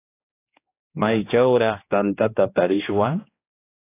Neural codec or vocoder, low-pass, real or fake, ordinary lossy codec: codec, 16 kHz, 1 kbps, X-Codec, HuBERT features, trained on general audio; 3.6 kHz; fake; AAC, 24 kbps